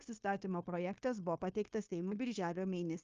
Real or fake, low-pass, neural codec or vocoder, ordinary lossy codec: fake; 7.2 kHz; codec, 16 kHz, 0.9 kbps, LongCat-Audio-Codec; Opus, 24 kbps